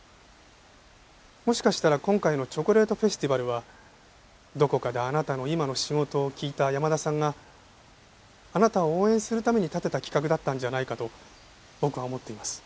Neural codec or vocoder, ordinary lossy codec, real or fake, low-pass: none; none; real; none